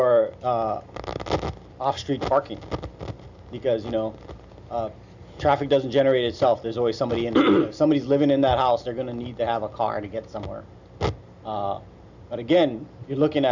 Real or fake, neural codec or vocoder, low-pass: real; none; 7.2 kHz